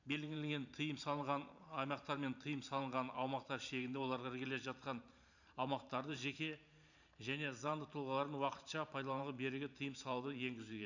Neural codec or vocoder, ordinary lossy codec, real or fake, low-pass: vocoder, 44.1 kHz, 128 mel bands every 512 samples, BigVGAN v2; none; fake; 7.2 kHz